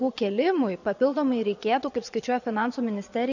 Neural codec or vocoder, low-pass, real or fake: vocoder, 22.05 kHz, 80 mel bands, Vocos; 7.2 kHz; fake